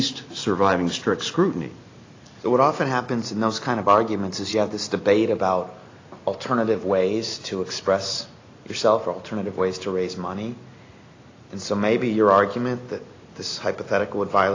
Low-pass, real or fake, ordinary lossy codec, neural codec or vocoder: 7.2 kHz; real; AAC, 32 kbps; none